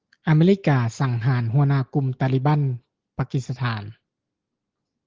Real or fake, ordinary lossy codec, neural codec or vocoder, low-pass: real; Opus, 16 kbps; none; 7.2 kHz